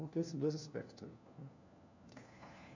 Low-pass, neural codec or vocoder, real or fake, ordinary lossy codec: 7.2 kHz; codec, 16 kHz, 1 kbps, FunCodec, trained on LibriTTS, 50 frames a second; fake; none